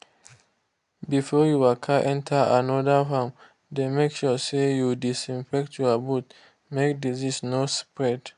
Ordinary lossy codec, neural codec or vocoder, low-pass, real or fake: AAC, 96 kbps; none; 10.8 kHz; real